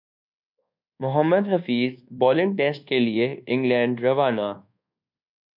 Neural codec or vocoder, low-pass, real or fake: codec, 24 kHz, 1.2 kbps, DualCodec; 5.4 kHz; fake